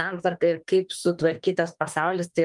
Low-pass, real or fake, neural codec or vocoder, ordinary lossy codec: 10.8 kHz; fake; autoencoder, 48 kHz, 32 numbers a frame, DAC-VAE, trained on Japanese speech; Opus, 32 kbps